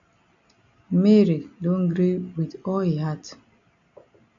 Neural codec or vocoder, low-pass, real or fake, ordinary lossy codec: none; 7.2 kHz; real; AAC, 64 kbps